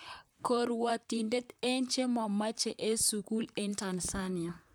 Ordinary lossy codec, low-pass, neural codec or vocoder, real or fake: none; none; vocoder, 44.1 kHz, 128 mel bands, Pupu-Vocoder; fake